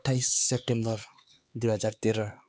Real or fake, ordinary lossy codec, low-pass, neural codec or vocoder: fake; none; none; codec, 16 kHz, 4 kbps, X-Codec, HuBERT features, trained on LibriSpeech